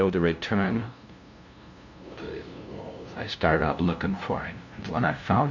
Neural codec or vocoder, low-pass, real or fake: codec, 16 kHz, 0.5 kbps, FunCodec, trained on LibriTTS, 25 frames a second; 7.2 kHz; fake